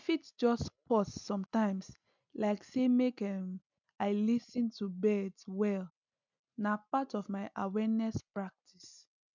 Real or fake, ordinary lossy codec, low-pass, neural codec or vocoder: real; none; 7.2 kHz; none